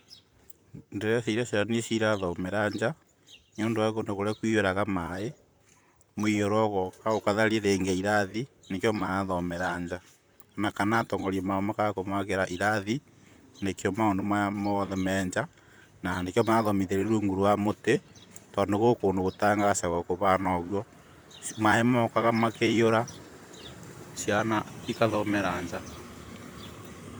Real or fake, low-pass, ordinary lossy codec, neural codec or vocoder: fake; none; none; vocoder, 44.1 kHz, 128 mel bands, Pupu-Vocoder